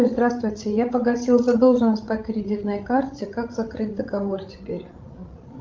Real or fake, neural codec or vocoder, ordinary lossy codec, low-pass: fake; codec, 16 kHz, 16 kbps, FreqCodec, larger model; Opus, 32 kbps; 7.2 kHz